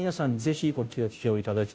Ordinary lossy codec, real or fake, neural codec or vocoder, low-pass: none; fake; codec, 16 kHz, 0.5 kbps, FunCodec, trained on Chinese and English, 25 frames a second; none